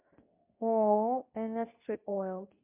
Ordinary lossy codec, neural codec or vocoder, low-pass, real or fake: MP3, 32 kbps; codec, 24 kHz, 0.9 kbps, WavTokenizer, medium speech release version 2; 3.6 kHz; fake